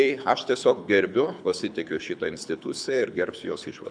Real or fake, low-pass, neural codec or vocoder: fake; 9.9 kHz; codec, 24 kHz, 6 kbps, HILCodec